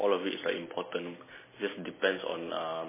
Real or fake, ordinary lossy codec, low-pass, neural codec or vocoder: real; MP3, 16 kbps; 3.6 kHz; none